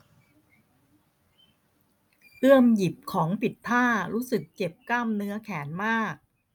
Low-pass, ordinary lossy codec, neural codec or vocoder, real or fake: none; none; none; real